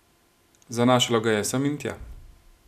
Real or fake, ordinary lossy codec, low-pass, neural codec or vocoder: real; none; 14.4 kHz; none